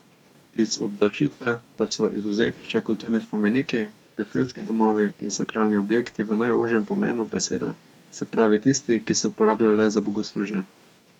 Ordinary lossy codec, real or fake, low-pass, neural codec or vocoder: none; fake; 19.8 kHz; codec, 44.1 kHz, 2.6 kbps, DAC